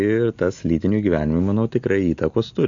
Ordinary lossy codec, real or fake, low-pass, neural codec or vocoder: MP3, 48 kbps; real; 7.2 kHz; none